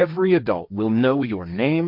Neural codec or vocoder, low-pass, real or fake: codec, 16 kHz, 1.1 kbps, Voila-Tokenizer; 5.4 kHz; fake